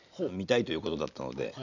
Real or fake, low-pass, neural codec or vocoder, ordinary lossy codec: real; 7.2 kHz; none; none